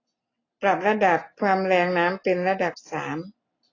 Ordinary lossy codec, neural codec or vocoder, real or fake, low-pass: AAC, 32 kbps; none; real; 7.2 kHz